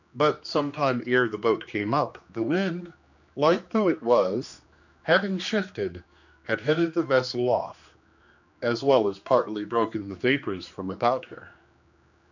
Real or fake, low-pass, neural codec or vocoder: fake; 7.2 kHz; codec, 16 kHz, 2 kbps, X-Codec, HuBERT features, trained on general audio